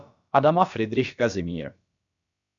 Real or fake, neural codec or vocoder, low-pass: fake; codec, 16 kHz, about 1 kbps, DyCAST, with the encoder's durations; 7.2 kHz